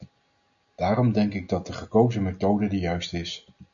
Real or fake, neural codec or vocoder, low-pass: real; none; 7.2 kHz